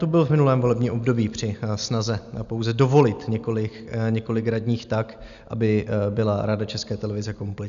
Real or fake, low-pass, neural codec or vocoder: real; 7.2 kHz; none